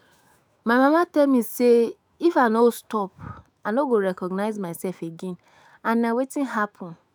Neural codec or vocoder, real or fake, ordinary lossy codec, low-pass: autoencoder, 48 kHz, 128 numbers a frame, DAC-VAE, trained on Japanese speech; fake; none; none